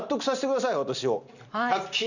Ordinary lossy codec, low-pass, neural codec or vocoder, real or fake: none; 7.2 kHz; none; real